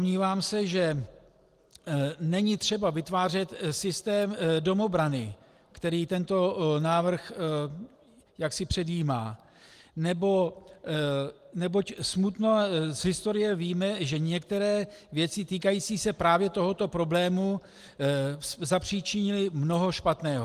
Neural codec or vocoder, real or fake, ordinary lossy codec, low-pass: none; real; Opus, 24 kbps; 14.4 kHz